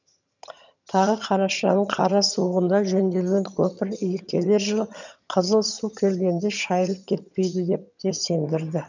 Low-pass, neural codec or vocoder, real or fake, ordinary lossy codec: 7.2 kHz; vocoder, 22.05 kHz, 80 mel bands, HiFi-GAN; fake; none